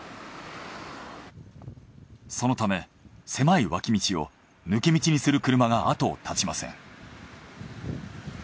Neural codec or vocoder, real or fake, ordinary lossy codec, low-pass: none; real; none; none